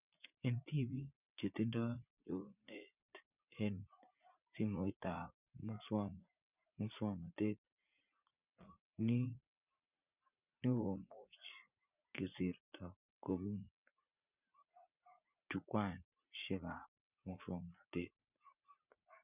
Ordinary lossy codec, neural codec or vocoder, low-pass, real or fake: none; vocoder, 44.1 kHz, 80 mel bands, Vocos; 3.6 kHz; fake